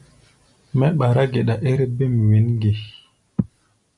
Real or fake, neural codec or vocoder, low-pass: real; none; 10.8 kHz